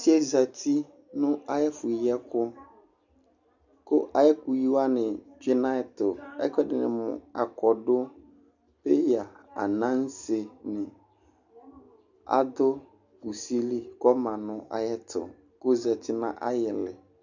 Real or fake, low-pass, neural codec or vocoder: real; 7.2 kHz; none